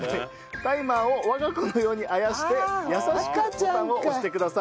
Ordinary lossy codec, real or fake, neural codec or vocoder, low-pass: none; real; none; none